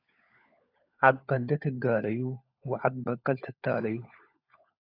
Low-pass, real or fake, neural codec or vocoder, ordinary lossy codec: 5.4 kHz; fake; codec, 16 kHz, 16 kbps, FunCodec, trained on LibriTTS, 50 frames a second; AAC, 32 kbps